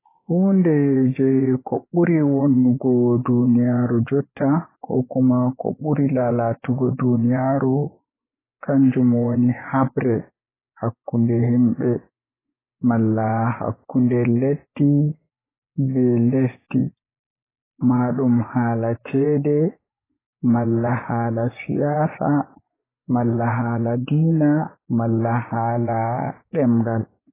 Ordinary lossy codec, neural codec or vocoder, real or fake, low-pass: AAC, 16 kbps; vocoder, 24 kHz, 100 mel bands, Vocos; fake; 3.6 kHz